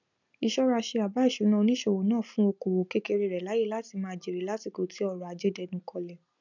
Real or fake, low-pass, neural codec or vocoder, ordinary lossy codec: real; 7.2 kHz; none; none